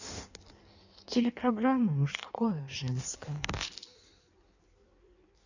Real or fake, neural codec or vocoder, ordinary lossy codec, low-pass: fake; codec, 16 kHz in and 24 kHz out, 1.1 kbps, FireRedTTS-2 codec; none; 7.2 kHz